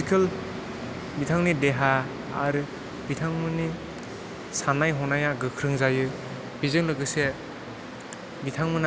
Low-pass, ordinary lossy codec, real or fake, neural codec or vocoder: none; none; real; none